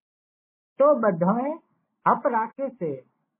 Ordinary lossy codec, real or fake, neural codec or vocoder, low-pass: MP3, 16 kbps; real; none; 3.6 kHz